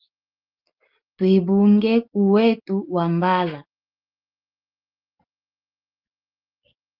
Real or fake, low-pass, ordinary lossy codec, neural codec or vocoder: real; 5.4 kHz; Opus, 32 kbps; none